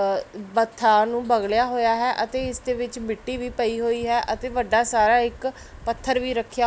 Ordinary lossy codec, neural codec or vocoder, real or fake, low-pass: none; none; real; none